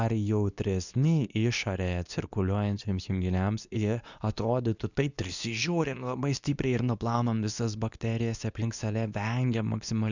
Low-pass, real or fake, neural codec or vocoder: 7.2 kHz; fake; codec, 24 kHz, 0.9 kbps, WavTokenizer, medium speech release version 2